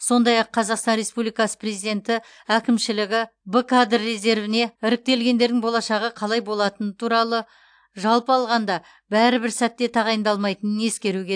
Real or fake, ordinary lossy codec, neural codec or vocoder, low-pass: real; AAC, 64 kbps; none; 9.9 kHz